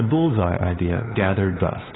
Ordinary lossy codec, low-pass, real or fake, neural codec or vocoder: AAC, 16 kbps; 7.2 kHz; fake; codec, 16 kHz, 2 kbps, FunCodec, trained on LibriTTS, 25 frames a second